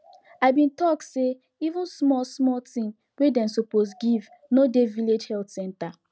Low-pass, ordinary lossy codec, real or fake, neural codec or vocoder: none; none; real; none